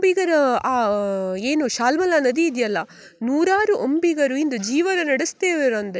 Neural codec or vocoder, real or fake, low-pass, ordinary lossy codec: none; real; none; none